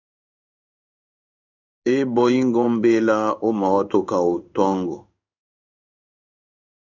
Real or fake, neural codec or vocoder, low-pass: fake; codec, 16 kHz in and 24 kHz out, 1 kbps, XY-Tokenizer; 7.2 kHz